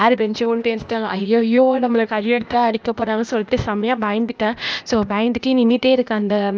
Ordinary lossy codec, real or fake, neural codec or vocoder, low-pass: none; fake; codec, 16 kHz, 0.8 kbps, ZipCodec; none